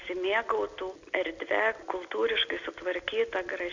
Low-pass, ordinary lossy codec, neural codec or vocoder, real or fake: 7.2 kHz; Opus, 64 kbps; none; real